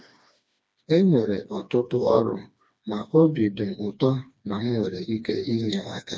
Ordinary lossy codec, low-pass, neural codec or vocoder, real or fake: none; none; codec, 16 kHz, 2 kbps, FreqCodec, smaller model; fake